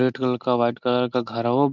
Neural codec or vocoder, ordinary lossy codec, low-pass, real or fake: none; none; 7.2 kHz; real